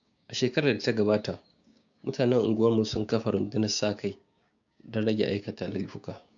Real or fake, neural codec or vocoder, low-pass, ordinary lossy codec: fake; codec, 16 kHz, 6 kbps, DAC; 7.2 kHz; AAC, 64 kbps